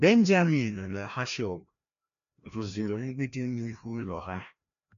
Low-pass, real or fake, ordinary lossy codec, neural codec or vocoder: 7.2 kHz; fake; none; codec, 16 kHz, 1 kbps, FreqCodec, larger model